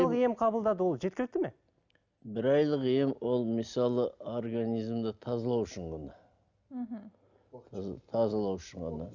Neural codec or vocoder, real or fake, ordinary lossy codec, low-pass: none; real; none; 7.2 kHz